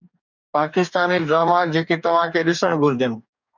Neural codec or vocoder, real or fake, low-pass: codec, 44.1 kHz, 2.6 kbps, DAC; fake; 7.2 kHz